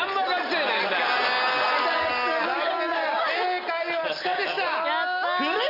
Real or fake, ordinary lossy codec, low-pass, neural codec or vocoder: real; none; 5.4 kHz; none